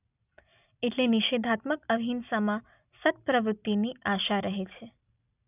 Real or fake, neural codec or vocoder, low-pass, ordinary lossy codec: real; none; 3.6 kHz; none